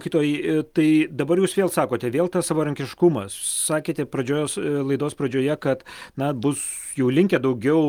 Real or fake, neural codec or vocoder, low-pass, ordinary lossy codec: real; none; 19.8 kHz; Opus, 32 kbps